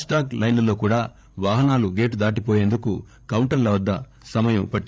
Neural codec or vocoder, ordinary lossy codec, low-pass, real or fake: codec, 16 kHz, 8 kbps, FreqCodec, larger model; none; none; fake